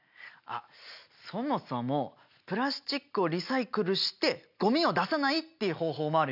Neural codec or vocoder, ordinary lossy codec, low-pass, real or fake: none; none; 5.4 kHz; real